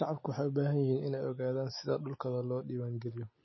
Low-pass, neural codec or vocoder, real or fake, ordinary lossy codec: 7.2 kHz; none; real; MP3, 24 kbps